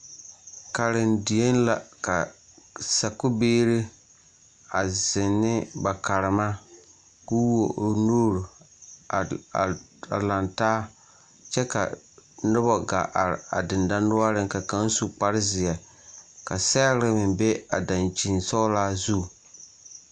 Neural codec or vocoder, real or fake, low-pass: none; real; 9.9 kHz